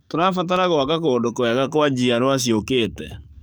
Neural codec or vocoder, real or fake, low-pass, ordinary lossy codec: codec, 44.1 kHz, 7.8 kbps, DAC; fake; none; none